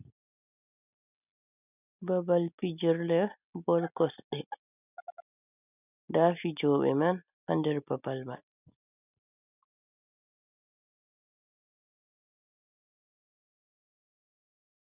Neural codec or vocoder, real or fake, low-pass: none; real; 3.6 kHz